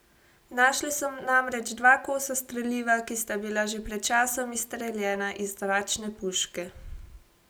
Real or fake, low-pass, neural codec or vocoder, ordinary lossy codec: real; none; none; none